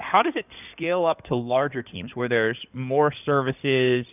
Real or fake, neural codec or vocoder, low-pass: fake; codec, 16 kHz in and 24 kHz out, 2.2 kbps, FireRedTTS-2 codec; 3.6 kHz